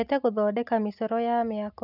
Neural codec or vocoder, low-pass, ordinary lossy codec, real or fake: none; 5.4 kHz; none; real